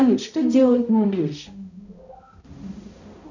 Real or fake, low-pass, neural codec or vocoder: fake; 7.2 kHz; codec, 16 kHz, 0.5 kbps, X-Codec, HuBERT features, trained on balanced general audio